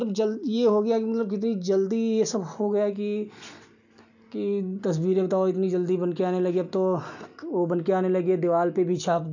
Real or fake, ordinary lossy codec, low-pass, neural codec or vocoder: real; none; 7.2 kHz; none